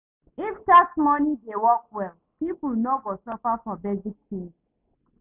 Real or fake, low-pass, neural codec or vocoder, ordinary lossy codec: real; 3.6 kHz; none; none